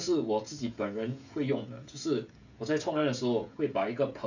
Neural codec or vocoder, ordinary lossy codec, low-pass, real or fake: codec, 16 kHz, 16 kbps, FreqCodec, smaller model; none; 7.2 kHz; fake